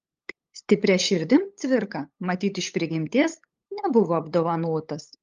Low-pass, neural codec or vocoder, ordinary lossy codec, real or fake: 7.2 kHz; codec, 16 kHz, 8 kbps, FunCodec, trained on LibriTTS, 25 frames a second; Opus, 32 kbps; fake